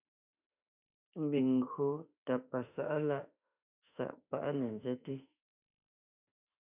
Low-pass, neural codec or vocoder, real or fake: 3.6 kHz; autoencoder, 48 kHz, 32 numbers a frame, DAC-VAE, trained on Japanese speech; fake